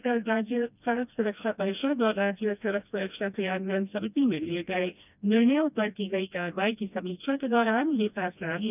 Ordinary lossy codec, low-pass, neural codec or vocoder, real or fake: none; 3.6 kHz; codec, 16 kHz, 1 kbps, FreqCodec, smaller model; fake